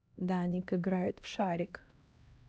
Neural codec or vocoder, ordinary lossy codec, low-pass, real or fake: codec, 16 kHz, 1 kbps, X-Codec, HuBERT features, trained on LibriSpeech; none; none; fake